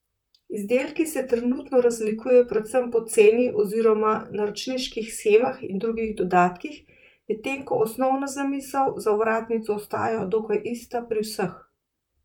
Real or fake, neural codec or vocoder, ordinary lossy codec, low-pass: fake; vocoder, 44.1 kHz, 128 mel bands, Pupu-Vocoder; none; 19.8 kHz